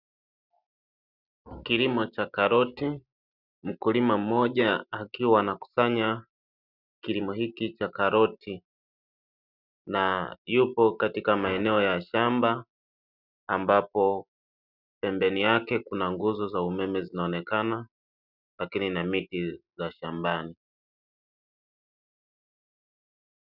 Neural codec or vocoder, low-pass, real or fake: none; 5.4 kHz; real